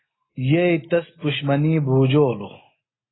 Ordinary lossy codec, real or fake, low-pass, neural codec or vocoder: AAC, 16 kbps; real; 7.2 kHz; none